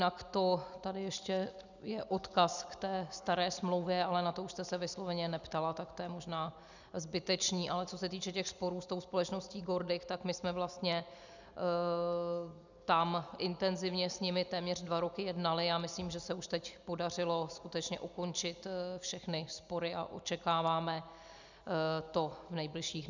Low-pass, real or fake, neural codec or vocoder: 7.2 kHz; real; none